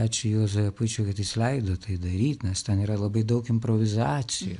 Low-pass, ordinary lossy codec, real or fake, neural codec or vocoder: 10.8 kHz; AAC, 96 kbps; real; none